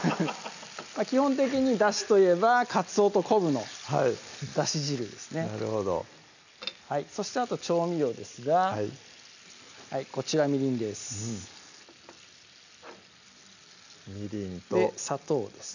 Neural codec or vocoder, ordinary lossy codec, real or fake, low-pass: none; none; real; 7.2 kHz